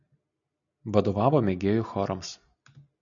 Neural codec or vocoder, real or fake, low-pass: none; real; 7.2 kHz